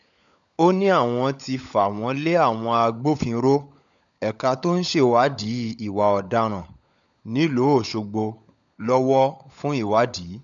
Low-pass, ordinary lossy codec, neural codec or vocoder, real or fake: 7.2 kHz; none; codec, 16 kHz, 16 kbps, FunCodec, trained on LibriTTS, 50 frames a second; fake